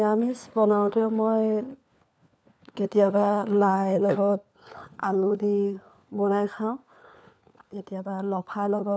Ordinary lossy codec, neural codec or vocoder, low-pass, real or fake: none; codec, 16 kHz, 4 kbps, FunCodec, trained on LibriTTS, 50 frames a second; none; fake